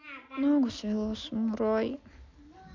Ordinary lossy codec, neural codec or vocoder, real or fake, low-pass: MP3, 64 kbps; none; real; 7.2 kHz